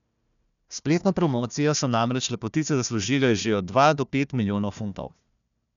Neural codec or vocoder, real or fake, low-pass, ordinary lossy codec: codec, 16 kHz, 1 kbps, FunCodec, trained on Chinese and English, 50 frames a second; fake; 7.2 kHz; none